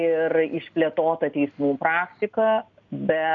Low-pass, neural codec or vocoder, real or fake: 7.2 kHz; none; real